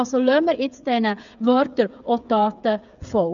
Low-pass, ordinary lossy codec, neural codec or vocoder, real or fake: 7.2 kHz; none; codec, 16 kHz, 8 kbps, FreqCodec, smaller model; fake